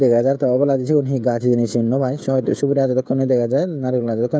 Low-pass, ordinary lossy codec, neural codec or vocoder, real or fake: none; none; codec, 16 kHz, 16 kbps, FreqCodec, smaller model; fake